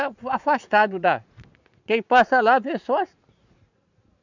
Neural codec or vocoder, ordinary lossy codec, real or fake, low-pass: vocoder, 44.1 kHz, 80 mel bands, Vocos; none; fake; 7.2 kHz